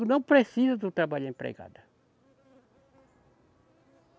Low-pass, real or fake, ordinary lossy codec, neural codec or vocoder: none; real; none; none